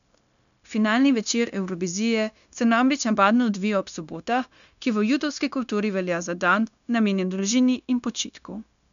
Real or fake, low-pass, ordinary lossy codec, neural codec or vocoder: fake; 7.2 kHz; none; codec, 16 kHz, 0.9 kbps, LongCat-Audio-Codec